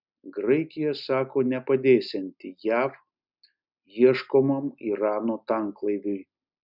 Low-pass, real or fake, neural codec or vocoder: 5.4 kHz; real; none